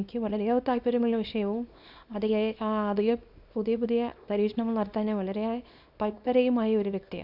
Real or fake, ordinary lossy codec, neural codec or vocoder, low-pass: fake; none; codec, 24 kHz, 0.9 kbps, WavTokenizer, small release; 5.4 kHz